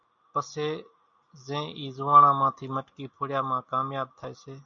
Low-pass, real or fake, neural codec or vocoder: 7.2 kHz; real; none